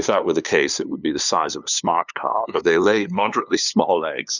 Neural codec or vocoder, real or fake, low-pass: codec, 16 kHz, 2 kbps, FunCodec, trained on LibriTTS, 25 frames a second; fake; 7.2 kHz